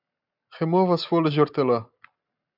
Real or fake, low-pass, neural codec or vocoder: real; 5.4 kHz; none